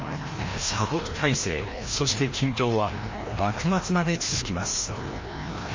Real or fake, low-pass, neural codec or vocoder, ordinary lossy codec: fake; 7.2 kHz; codec, 16 kHz, 1 kbps, FreqCodec, larger model; MP3, 32 kbps